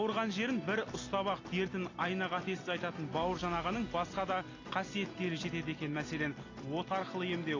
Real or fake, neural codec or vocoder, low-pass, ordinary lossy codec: real; none; 7.2 kHz; AAC, 32 kbps